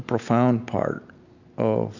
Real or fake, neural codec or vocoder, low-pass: real; none; 7.2 kHz